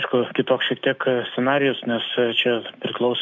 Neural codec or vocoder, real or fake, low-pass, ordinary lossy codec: none; real; 7.2 kHz; AAC, 48 kbps